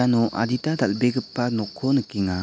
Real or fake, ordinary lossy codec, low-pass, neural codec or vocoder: real; none; none; none